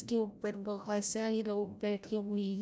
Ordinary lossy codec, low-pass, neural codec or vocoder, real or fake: none; none; codec, 16 kHz, 0.5 kbps, FreqCodec, larger model; fake